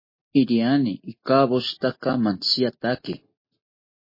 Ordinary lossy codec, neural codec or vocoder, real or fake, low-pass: MP3, 24 kbps; none; real; 5.4 kHz